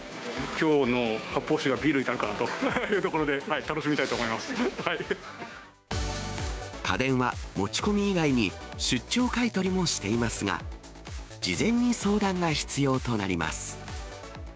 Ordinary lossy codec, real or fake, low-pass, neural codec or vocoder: none; fake; none; codec, 16 kHz, 6 kbps, DAC